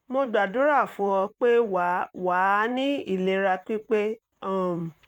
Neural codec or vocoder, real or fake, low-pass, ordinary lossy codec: vocoder, 44.1 kHz, 128 mel bands, Pupu-Vocoder; fake; 19.8 kHz; none